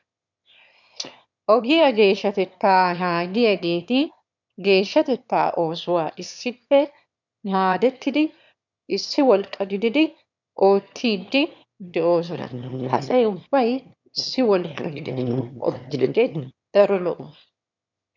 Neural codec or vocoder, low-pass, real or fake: autoencoder, 22.05 kHz, a latent of 192 numbers a frame, VITS, trained on one speaker; 7.2 kHz; fake